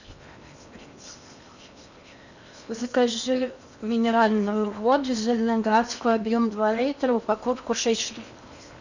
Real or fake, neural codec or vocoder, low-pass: fake; codec, 16 kHz in and 24 kHz out, 0.8 kbps, FocalCodec, streaming, 65536 codes; 7.2 kHz